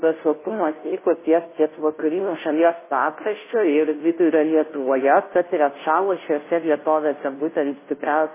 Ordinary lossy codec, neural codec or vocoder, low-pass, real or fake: MP3, 16 kbps; codec, 16 kHz, 0.5 kbps, FunCodec, trained on Chinese and English, 25 frames a second; 3.6 kHz; fake